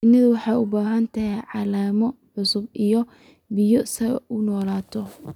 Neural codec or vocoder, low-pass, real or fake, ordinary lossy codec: none; 19.8 kHz; real; none